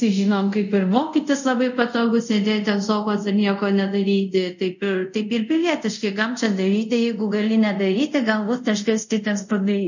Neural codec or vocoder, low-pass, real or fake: codec, 24 kHz, 0.5 kbps, DualCodec; 7.2 kHz; fake